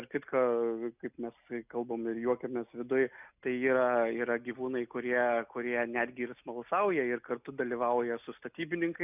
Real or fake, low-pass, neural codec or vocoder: real; 3.6 kHz; none